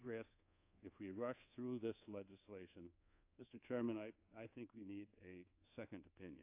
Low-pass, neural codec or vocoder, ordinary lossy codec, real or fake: 3.6 kHz; codec, 24 kHz, 1.2 kbps, DualCodec; AAC, 32 kbps; fake